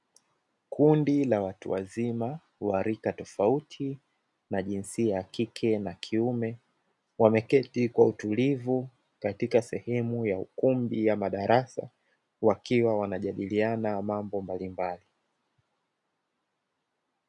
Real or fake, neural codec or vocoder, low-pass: real; none; 10.8 kHz